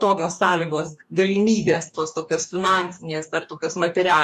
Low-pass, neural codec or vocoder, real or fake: 14.4 kHz; codec, 44.1 kHz, 2.6 kbps, DAC; fake